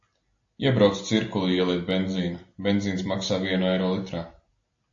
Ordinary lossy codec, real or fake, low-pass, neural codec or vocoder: AAC, 48 kbps; real; 7.2 kHz; none